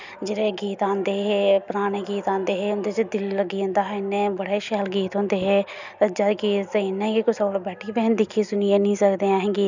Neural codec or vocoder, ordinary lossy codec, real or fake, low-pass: none; none; real; 7.2 kHz